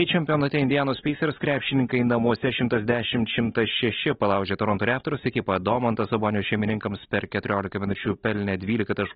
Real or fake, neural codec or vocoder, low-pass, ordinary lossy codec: real; none; 7.2 kHz; AAC, 16 kbps